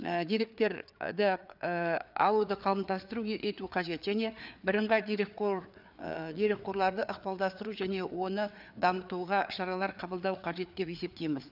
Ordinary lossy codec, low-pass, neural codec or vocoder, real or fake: none; 5.4 kHz; codec, 16 kHz, 4 kbps, FreqCodec, larger model; fake